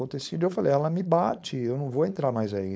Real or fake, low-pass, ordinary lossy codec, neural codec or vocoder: fake; none; none; codec, 16 kHz, 4.8 kbps, FACodec